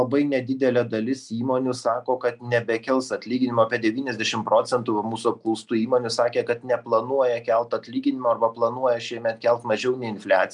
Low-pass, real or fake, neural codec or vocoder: 10.8 kHz; real; none